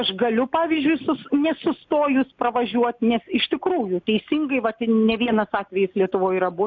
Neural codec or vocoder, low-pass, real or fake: none; 7.2 kHz; real